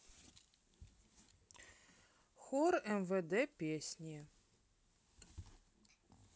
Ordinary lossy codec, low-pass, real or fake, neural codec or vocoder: none; none; real; none